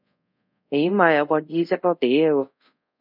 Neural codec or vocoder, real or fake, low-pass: codec, 24 kHz, 0.5 kbps, DualCodec; fake; 5.4 kHz